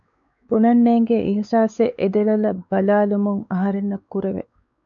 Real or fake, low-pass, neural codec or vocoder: fake; 7.2 kHz; codec, 16 kHz, 4 kbps, X-Codec, WavLM features, trained on Multilingual LibriSpeech